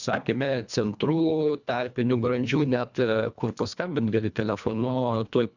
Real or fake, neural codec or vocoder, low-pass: fake; codec, 24 kHz, 1.5 kbps, HILCodec; 7.2 kHz